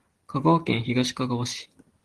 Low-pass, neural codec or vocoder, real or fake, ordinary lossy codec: 10.8 kHz; none; real; Opus, 16 kbps